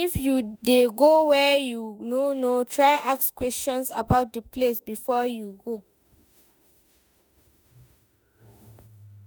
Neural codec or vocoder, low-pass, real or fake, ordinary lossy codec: autoencoder, 48 kHz, 32 numbers a frame, DAC-VAE, trained on Japanese speech; none; fake; none